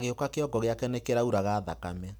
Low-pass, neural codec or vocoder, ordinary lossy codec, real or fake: none; none; none; real